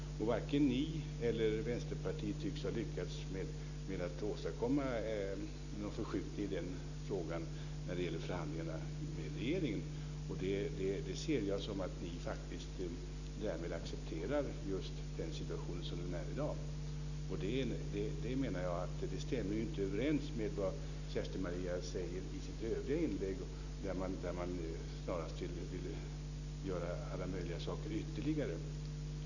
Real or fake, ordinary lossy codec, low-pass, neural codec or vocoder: real; none; 7.2 kHz; none